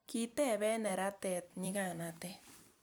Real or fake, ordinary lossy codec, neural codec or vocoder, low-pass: fake; none; vocoder, 44.1 kHz, 128 mel bands every 256 samples, BigVGAN v2; none